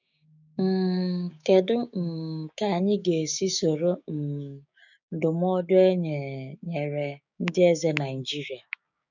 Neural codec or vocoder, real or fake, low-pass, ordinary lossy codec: codec, 16 kHz, 6 kbps, DAC; fake; 7.2 kHz; none